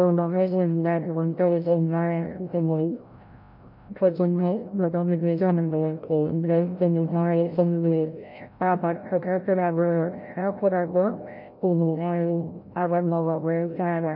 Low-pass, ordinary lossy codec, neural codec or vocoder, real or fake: 5.4 kHz; none; codec, 16 kHz, 0.5 kbps, FreqCodec, larger model; fake